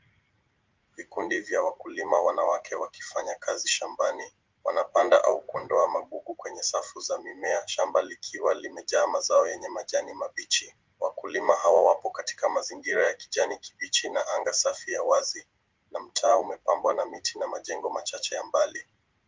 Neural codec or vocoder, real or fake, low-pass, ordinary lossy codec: vocoder, 44.1 kHz, 80 mel bands, Vocos; fake; 7.2 kHz; Opus, 32 kbps